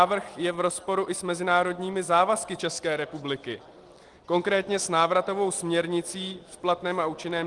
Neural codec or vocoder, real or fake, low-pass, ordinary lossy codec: none; real; 10.8 kHz; Opus, 24 kbps